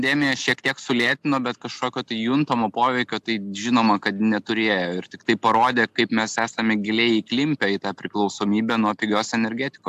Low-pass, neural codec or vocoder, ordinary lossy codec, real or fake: 14.4 kHz; none; AAC, 96 kbps; real